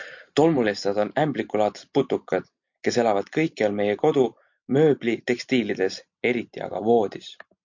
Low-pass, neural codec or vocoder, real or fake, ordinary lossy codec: 7.2 kHz; none; real; MP3, 48 kbps